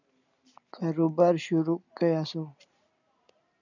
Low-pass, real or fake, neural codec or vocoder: 7.2 kHz; real; none